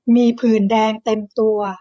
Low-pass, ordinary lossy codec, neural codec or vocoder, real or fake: none; none; codec, 16 kHz, 16 kbps, FreqCodec, larger model; fake